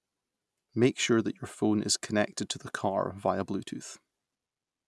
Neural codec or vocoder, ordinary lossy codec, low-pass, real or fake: none; none; none; real